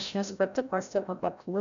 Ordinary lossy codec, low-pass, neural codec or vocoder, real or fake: MP3, 64 kbps; 7.2 kHz; codec, 16 kHz, 0.5 kbps, FreqCodec, larger model; fake